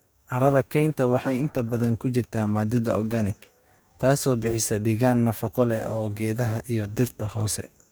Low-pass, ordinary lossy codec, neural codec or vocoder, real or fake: none; none; codec, 44.1 kHz, 2.6 kbps, DAC; fake